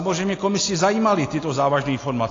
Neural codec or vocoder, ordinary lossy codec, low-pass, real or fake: none; AAC, 32 kbps; 7.2 kHz; real